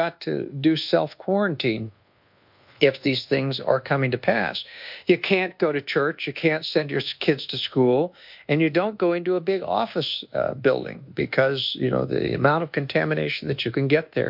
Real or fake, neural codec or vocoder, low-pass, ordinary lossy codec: fake; codec, 24 kHz, 1.2 kbps, DualCodec; 5.4 kHz; MP3, 48 kbps